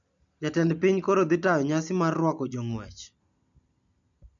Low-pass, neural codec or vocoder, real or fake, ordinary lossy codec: 7.2 kHz; none; real; none